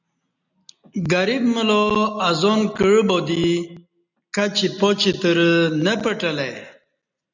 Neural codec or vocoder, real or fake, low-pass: none; real; 7.2 kHz